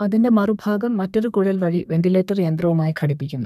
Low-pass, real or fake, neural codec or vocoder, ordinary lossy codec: 14.4 kHz; fake; codec, 32 kHz, 1.9 kbps, SNAC; none